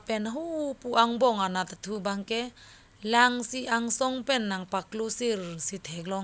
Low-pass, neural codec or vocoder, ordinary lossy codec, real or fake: none; none; none; real